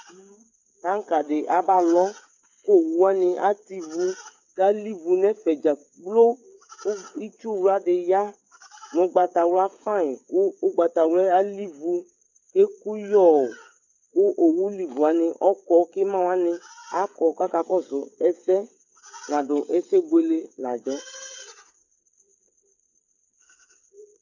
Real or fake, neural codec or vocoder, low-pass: fake; codec, 16 kHz, 8 kbps, FreqCodec, smaller model; 7.2 kHz